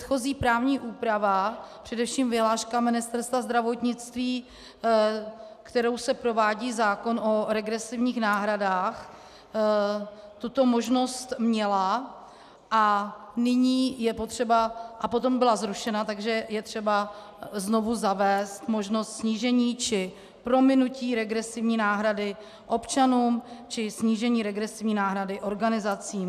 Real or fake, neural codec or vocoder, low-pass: real; none; 14.4 kHz